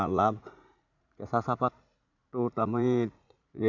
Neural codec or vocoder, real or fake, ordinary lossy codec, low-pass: vocoder, 44.1 kHz, 80 mel bands, Vocos; fake; none; 7.2 kHz